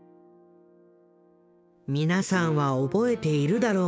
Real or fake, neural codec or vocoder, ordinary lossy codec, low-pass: fake; codec, 16 kHz, 6 kbps, DAC; none; none